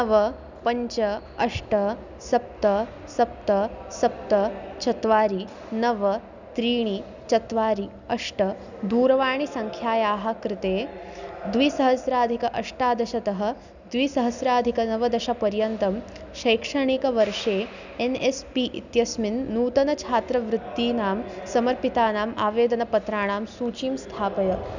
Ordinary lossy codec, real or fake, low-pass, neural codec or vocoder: none; real; 7.2 kHz; none